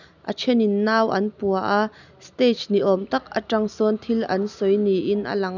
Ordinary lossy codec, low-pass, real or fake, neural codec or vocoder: none; 7.2 kHz; real; none